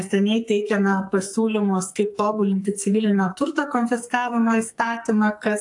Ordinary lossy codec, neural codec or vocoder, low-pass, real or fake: AAC, 64 kbps; codec, 44.1 kHz, 2.6 kbps, SNAC; 10.8 kHz; fake